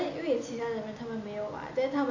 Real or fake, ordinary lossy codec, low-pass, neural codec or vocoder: real; none; 7.2 kHz; none